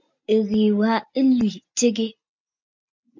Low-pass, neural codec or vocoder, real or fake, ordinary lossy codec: 7.2 kHz; none; real; MP3, 48 kbps